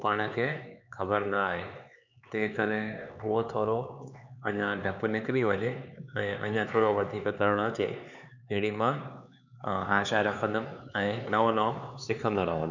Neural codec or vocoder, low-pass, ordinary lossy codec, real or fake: codec, 16 kHz, 4 kbps, X-Codec, HuBERT features, trained on LibriSpeech; 7.2 kHz; none; fake